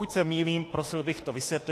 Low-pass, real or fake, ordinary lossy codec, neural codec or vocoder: 14.4 kHz; fake; AAC, 48 kbps; autoencoder, 48 kHz, 32 numbers a frame, DAC-VAE, trained on Japanese speech